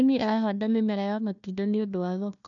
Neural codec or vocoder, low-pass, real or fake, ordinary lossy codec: codec, 16 kHz, 1 kbps, FunCodec, trained on Chinese and English, 50 frames a second; 7.2 kHz; fake; none